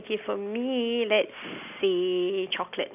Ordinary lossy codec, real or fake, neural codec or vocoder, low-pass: none; real; none; 3.6 kHz